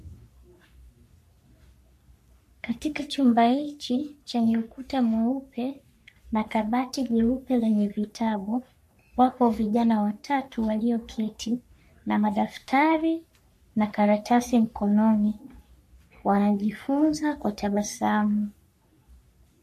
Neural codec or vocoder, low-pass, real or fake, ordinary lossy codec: codec, 44.1 kHz, 3.4 kbps, Pupu-Codec; 14.4 kHz; fake; MP3, 64 kbps